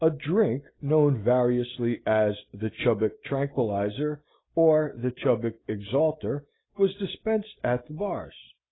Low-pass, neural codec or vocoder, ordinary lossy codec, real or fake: 7.2 kHz; none; AAC, 16 kbps; real